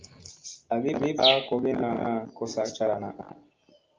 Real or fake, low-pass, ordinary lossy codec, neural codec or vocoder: real; 7.2 kHz; Opus, 24 kbps; none